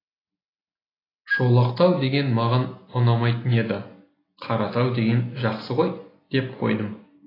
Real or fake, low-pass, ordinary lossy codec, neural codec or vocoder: real; 5.4 kHz; AAC, 24 kbps; none